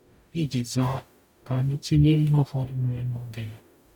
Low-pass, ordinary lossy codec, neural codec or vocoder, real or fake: 19.8 kHz; none; codec, 44.1 kHz, 0.9 kbps, DAC; fake